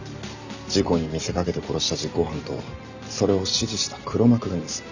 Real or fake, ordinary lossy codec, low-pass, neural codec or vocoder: real; none; 7.2 kHz; none